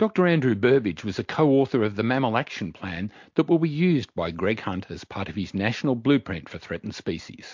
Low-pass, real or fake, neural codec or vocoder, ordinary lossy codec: 7.2 kHz; real; none; MP3, 48 kbps